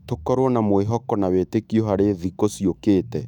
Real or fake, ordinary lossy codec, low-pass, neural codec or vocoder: fake; none; 19.8 kHz; autoencoder, 48 kHz, 128 numbers a frame, DAC-VAE, trained on Japanese speech